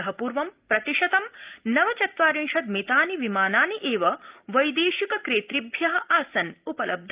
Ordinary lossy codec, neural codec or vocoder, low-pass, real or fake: Opus, 24 kbps; none; 3.6 kHz; real